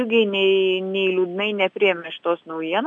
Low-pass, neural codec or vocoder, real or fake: 9.9 kHz; none; real